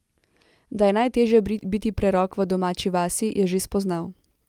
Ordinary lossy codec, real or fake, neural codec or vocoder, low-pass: Opus, 32 kbps; real; none; 19.8 kHz